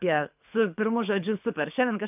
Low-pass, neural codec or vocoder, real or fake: 3.6 kHz; codec, 24 kHz, 6 kbps, HILCodec; fake